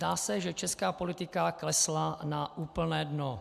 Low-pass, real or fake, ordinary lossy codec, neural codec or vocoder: 14.4 kHz; real; AAC, 96 kbps; none